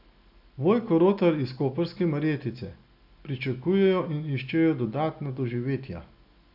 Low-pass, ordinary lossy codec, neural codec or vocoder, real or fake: 5.4 kHz; none; none; real